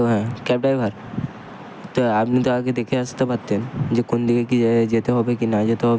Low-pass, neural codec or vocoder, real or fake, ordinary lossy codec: none; none; real; none